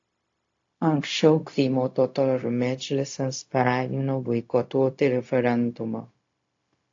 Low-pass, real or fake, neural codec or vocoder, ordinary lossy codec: 7.2 kHz; fake; codec, 16 kHz, 0.4 kbps, LongCat-Audio-Codec; AAC, 48 kbps